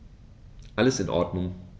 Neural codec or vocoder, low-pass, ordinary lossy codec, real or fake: none; none; none; real